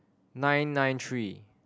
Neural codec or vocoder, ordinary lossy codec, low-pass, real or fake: none; none; none; real